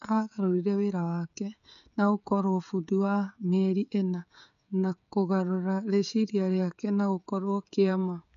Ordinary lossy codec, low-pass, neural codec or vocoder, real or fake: none; 7.2 kHz; codec, 16 kHz, 16 kbps, FreqCodec, smaller model; fake